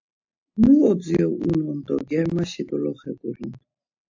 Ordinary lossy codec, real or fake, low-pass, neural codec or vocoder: AAC, 48 kbps; real; 7.2 kHz; none